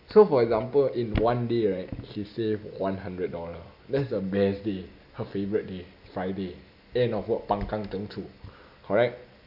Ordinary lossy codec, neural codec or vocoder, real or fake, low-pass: none; none; real; 5.4 kHz